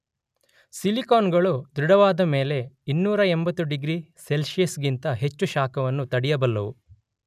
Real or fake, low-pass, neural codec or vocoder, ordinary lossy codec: real; 14.4 kHz; none; none